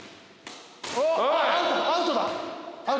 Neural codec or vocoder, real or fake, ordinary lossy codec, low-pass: none; real; none; none